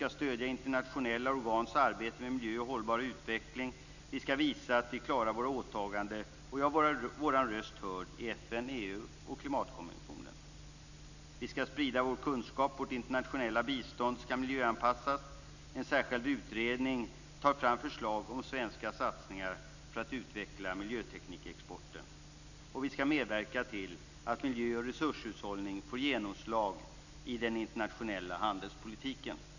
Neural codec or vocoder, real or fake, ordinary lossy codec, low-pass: none; real; none; 7.2 kHz